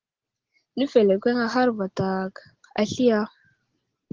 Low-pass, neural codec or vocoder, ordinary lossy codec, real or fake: 7.2 kHz; none; Opus, 16 kbps; real